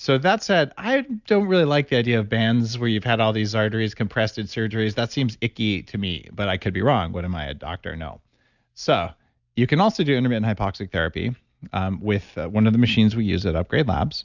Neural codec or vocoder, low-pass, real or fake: none; 7.2 kHz; real